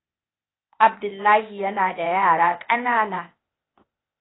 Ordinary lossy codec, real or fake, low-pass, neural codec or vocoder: AAC, 16 kbps; fake; 7.2 kHz; codec, 16 kHz, 0.8 kbps, ZipCodec